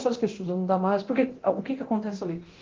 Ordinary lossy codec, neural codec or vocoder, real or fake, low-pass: Opus, 16 kbps; codec, 24 kHz, 0.9 kbps, DualCodec; fake; 7.2 kHz